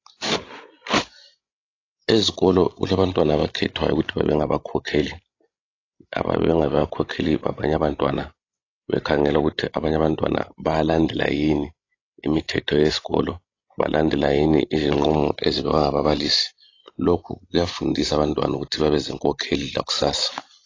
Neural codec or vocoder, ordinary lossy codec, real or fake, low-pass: codec, 16 kHz, 16 kbps, FreqCodec, larger model; AAC, 32 kbps; fake; 7.2 kHz